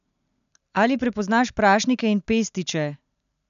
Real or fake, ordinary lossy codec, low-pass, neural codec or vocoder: real; none; 7.2 kHz; none